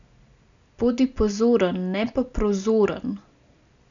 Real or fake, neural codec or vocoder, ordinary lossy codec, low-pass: real; none; none; 7.2 kHz